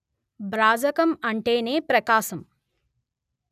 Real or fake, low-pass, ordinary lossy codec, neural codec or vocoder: real; 14.4 kHz; none; none